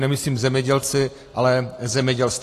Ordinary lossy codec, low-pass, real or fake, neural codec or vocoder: AAC, 48 kbps; 14.4 kHz; real; none